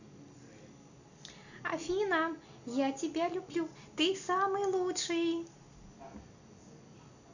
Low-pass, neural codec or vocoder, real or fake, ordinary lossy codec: 7.2 kHz; none; real; none